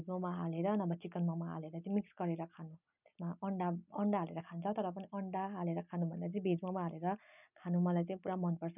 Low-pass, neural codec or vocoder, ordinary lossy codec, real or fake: 3.6 kHz; none; none; real